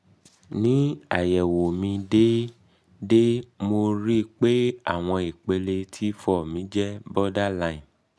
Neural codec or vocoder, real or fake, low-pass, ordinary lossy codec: none; real; none; none